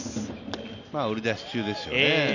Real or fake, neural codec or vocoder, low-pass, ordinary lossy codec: real; none; 7.2 kHz; none